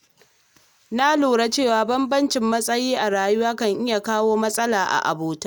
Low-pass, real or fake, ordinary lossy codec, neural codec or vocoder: none; real; none; none